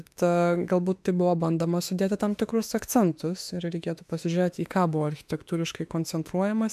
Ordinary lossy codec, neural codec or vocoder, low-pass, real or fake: MP3, 96 kbps; autoencoder, 48 kHz, 32 numbers a frame, DAC-VAE, trained on Japanese speech; 14.4 kHz; fake